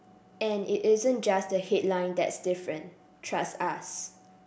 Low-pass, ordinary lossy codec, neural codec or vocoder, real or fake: none; none; none; real